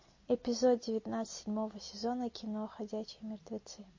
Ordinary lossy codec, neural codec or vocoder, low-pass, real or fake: MP3, 32 kbps; none; 7.2 kHz; real